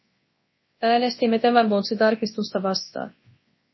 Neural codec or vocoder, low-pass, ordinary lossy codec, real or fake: codec, 24 kHz, 0.9 kbps, WavTokenizer, large speech release; 7.2 kHz; MP3, 24 kbps; fake